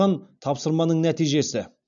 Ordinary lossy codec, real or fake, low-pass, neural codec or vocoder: none; real; 7.2 kHz; none